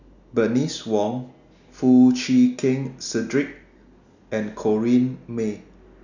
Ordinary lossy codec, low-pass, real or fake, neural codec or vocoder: none; 7.2 kHz; real; none